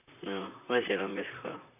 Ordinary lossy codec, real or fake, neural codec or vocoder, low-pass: none; fake; vocoder, 44.1 kHz, 128 mel bands, Pupu-Vocoder; 3.6 kHz